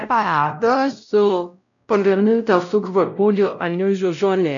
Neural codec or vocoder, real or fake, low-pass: codec, 16 kHz, 0.5 kbps, X-Codec, WavLM features, trained on Multilingual LibriSpeech; fake; 7.2 kHz